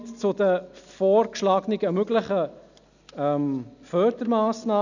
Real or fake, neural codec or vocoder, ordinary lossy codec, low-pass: real; none; none; 7.2 kHz